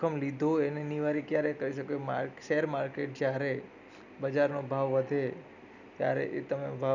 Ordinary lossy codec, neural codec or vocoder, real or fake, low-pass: none; none; real; 7.2 kHz